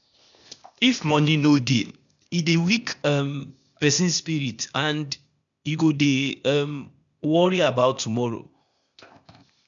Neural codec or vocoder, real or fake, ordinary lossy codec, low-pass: codec, 16 kHz, 0.8 kbps, ZipCodec; fake; none; 7.2 kHz